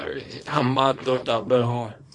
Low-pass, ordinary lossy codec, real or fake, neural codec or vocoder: 10.8 kHz; MP3, 48 kbps; fake; codec, 24 kHz, 0.9 kbps, WavTokenizer, small release